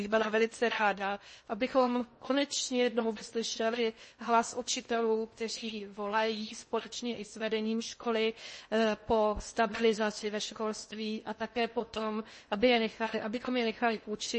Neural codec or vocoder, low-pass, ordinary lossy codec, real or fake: codec, 16 kHz in and 24 kHz out, 0.6 kbps, FocalCodec, streaming, 2048 codes; 10.8 kHz; MP3, 32 kbps; fake